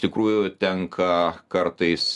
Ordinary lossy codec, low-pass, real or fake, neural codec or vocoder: MP3, 96 kbps; 10.8 kHz; real; none